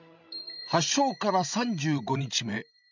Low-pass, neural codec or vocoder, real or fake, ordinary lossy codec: 7.2 kHz; codec, 16 kHz, 16 kbps, FreqCodec, larger model; fake; none